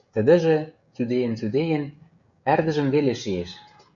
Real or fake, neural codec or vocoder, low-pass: fake; codec, 16 kHz, 16 kbps, FreqCodec, smaller model; 7.2 kHz